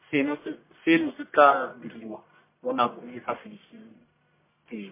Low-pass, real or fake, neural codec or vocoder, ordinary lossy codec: 3.6 kHz; fake; codec, 44.1 kHz, 1.7 kbps, Pupu-Codec; MP3, 24 kbps